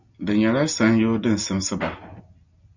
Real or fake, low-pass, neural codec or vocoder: real; 7.2 kHz; none